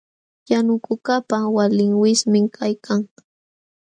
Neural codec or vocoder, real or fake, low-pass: none; real; 9.9 kHz